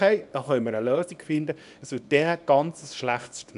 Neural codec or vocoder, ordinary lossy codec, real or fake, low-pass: codec, 24 kHz, 0.9 kbps, WavTokenizer, small release; none; fake; 10.8 kHz